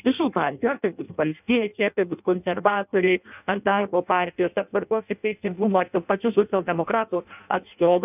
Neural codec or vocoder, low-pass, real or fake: codec, 16 kHz in and 24 kHz out, 0.6 kbps, FireRedTTS-2 codec; 3.6 kHz; fake